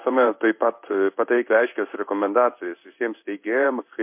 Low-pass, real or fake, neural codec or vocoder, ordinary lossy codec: 3.6 kHz; fake; codec, 16 kHz in and 24 kHz out, 1 kbps, XY-Tokenizer; MP3, 32 kbps